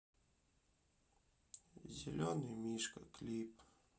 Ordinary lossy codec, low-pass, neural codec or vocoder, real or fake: none; none; none; real